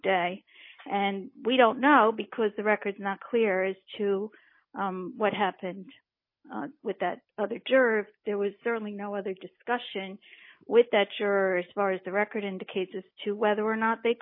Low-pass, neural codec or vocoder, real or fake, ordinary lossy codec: 5.4 kHz; none; real; MP3, 24 kbps